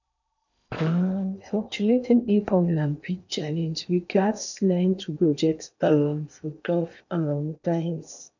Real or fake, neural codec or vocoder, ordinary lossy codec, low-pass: fake; codec, 16 kHz in and 24 kHz out, 0.8 kbps, FocalCodec, streaming, 65536 codes; none; 7.2 kHz